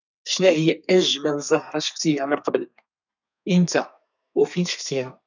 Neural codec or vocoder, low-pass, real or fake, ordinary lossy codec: codec, 32 kHz, 1.9 kbps, SNAC; 7.2 kHz; fake; none